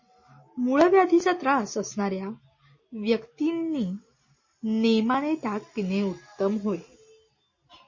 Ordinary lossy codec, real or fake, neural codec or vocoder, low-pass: MP3, 32 kbps; real; none; 7.2 kHz